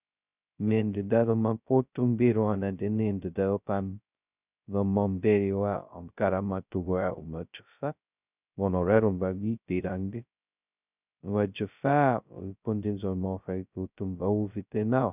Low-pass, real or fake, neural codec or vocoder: 3.6 kHz; fake; codec, 16 kHz, 0.2 kbps, FocalCodec